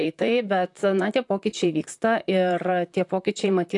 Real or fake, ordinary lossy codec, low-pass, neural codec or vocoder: fake; AAC, 64 kbps; 10.8 kHz; vocoder, 44.1 kHz, 128 mel bands, Pupu-Vocoder